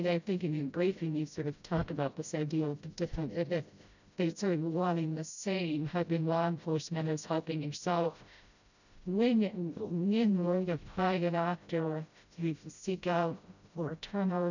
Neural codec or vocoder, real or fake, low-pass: codec, 16 kHz, 0.5 kbps, FreqCodec, smaller model; fake; 7.2 kHz